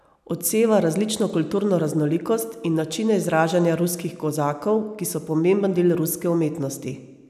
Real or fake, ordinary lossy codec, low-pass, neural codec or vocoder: real; none; 14.4 kHz; none